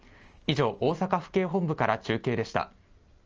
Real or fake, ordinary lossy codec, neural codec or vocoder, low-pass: real; Opus, 24 kbps; none; 7.2 kHz